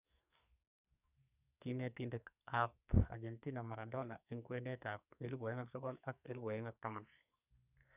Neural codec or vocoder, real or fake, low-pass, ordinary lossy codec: codec, 44.1 kHz, 2.6 kbps, SNAC; fake; 3.6 kHz; none